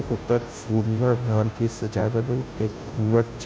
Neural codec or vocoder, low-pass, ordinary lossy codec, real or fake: codec, 16 kHz, 0.5 kbps, FunCodec, trained on Chinese and English, 25 frames a second; none; none; fake